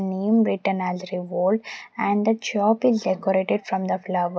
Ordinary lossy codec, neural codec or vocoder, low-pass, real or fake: none; none; none; real